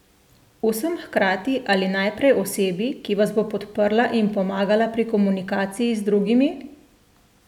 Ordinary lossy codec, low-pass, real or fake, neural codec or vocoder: none; 19.8 kHz; real; none